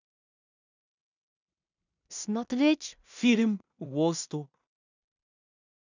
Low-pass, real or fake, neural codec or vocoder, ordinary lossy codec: 7.2 kHz; fake; codec, 16 kHz in and 24 kHz out, 0.4 kbps, LongCat-Audio-Codec, two codebook decoder; none